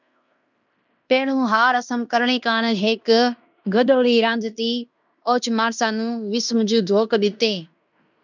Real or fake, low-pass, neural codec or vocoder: fake; 7.2 kHz; codec, 16 kHz in and 24 kHz out, 0.9 kbps, LongCat-Audio-Codec, fine tuned four codebook decoder